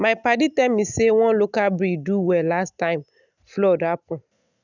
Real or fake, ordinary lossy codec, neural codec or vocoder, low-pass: real; none; none; 7.2 kHz